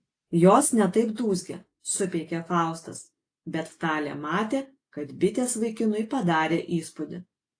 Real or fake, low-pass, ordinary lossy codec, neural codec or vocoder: real; 9.9 kHz; AAC, 48 kbps; none